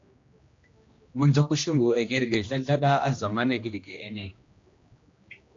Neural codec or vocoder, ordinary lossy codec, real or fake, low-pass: codec, 16 kHz, 1 kbps, X-Codec, HuBERT features, trained on general audio; AAC, 48 kbps; fake; 7.2 kHz